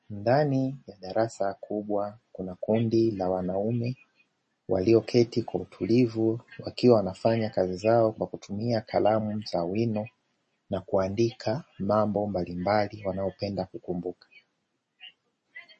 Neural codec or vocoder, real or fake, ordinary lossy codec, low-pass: none; real; MP3, 32 kbps; 9.9 kHz